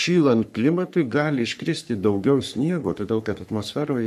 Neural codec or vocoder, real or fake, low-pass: codec, 44.1 kHz, 3.4 kbps, Pupu-Codec; fake; 14.4 kHz